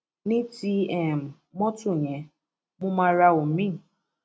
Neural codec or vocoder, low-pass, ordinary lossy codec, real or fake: none; none; none; real